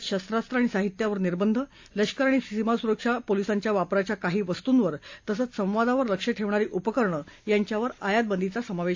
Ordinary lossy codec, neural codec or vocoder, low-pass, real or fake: AAC, 48 kbps; none; 7.2 kHz; real